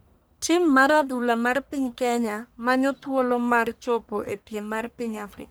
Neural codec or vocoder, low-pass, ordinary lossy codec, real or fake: codec, 44.1 kHz, 1.7 kbps, Pupu-Codec; none; none; fake